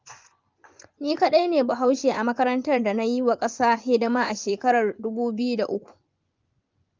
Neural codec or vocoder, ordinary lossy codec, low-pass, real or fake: vocoder, 24 kHz, 100 mel bands, Vocos; Opus, 24 kbps; 7.2 kHz; fake